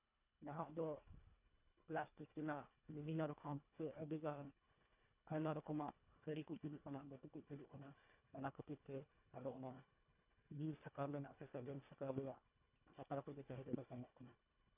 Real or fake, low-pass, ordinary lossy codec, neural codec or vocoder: fake; 3.6 kHz; none; codec, 24 kHz, 1.5 kbps, HILCodec